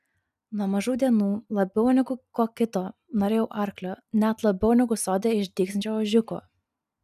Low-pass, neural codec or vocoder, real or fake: 14.4 kHz; none; real